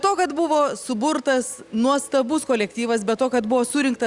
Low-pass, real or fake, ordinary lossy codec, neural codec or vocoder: 10.8 kHz; real; Opus, 64 kbps; none